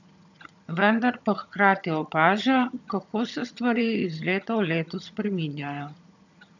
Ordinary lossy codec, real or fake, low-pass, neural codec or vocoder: none; fake; 7.2 kHz; vocoder, 22.05 kHz, 80 mel bands, HiFi-GAN